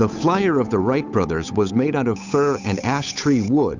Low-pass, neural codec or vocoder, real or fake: 7.2 kHz; codec, 16 kHz, 8 kbps, FunCodec, trained on Chinese and English, 25 frames a second; fake